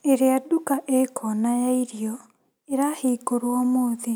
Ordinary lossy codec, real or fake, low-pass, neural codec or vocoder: none; real; none; none